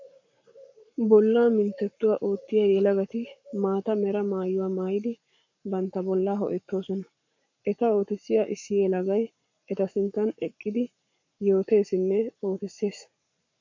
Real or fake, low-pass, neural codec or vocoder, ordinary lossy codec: fake; 7.2 kHz; codec, 44.1 kHz, 7.8 kbps, Pupu-Codec; MP3, 48 kbps